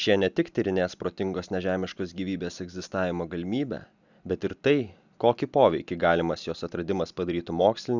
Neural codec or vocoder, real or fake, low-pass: none; real; 7.2 kHz